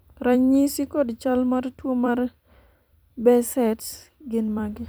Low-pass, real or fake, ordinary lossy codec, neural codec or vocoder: none; fake; none; vocoder, 44.1 kHz, 128 mel bands every 256 samples, BigVGAN v2